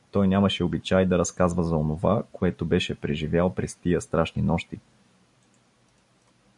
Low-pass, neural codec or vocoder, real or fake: 10.8 kHz; none; real